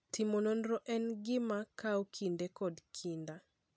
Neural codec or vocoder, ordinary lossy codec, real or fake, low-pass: none; none; real; none